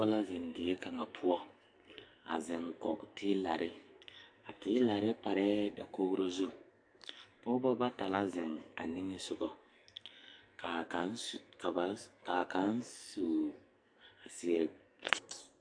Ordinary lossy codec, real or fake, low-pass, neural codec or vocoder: AAC, 64 kbps; fake; 9.9 kHz; codec, 44.1 kHz, 2.6 kbps, SNAC